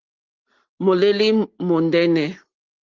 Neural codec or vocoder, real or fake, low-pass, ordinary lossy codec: none; real; 7.2 kHz; Opus, 16 kbps